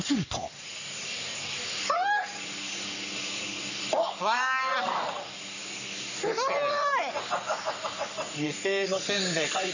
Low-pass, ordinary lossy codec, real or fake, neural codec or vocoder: 7.2 kHz; none; fake; codec, 44.1 kHz, 3.4 kbps, Pupu-Codec